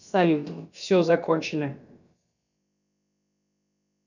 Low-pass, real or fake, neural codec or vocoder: 7.2 kHz; fake; codec, 16 kHz, about 1 kbps, DyCAST, with the encoder's durations